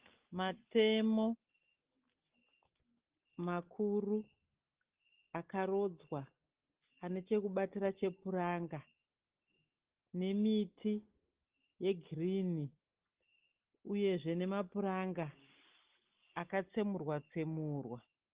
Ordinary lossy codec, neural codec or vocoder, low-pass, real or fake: Opus, 16 kbps; none; 3.6 kHz; real